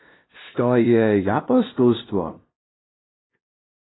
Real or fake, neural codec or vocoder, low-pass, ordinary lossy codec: fake; codec, 16 kHz, 0.5 kbps, FunCodec, trained on LibriTTS, 25 frames a second; 7.2 kHz; AAC, 16 kbps